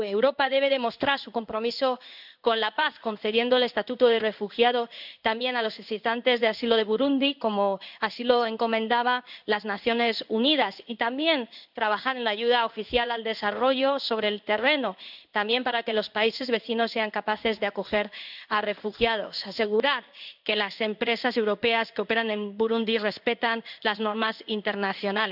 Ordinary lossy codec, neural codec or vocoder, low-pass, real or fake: none; codec, 16 kHz in and 24 kHz out, 1 kbps, XY-Tokenizer; 5.4 kHz; fake